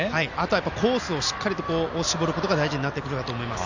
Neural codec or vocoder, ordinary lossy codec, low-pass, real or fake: none; none; 7.2 kHz; real